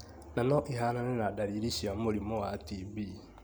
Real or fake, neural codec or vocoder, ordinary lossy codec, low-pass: real; none; none; none